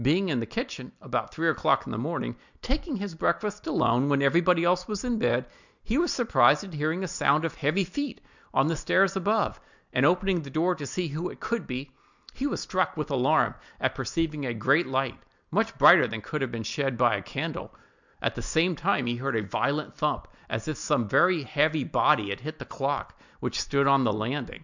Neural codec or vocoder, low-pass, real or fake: none; 7.2 kHz; real